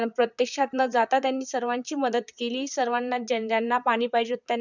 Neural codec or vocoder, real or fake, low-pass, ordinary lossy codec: vocoder, 44.1 kHz, 128 mel bands, Pupu-Vocoder; fake; 7.2 kHz; none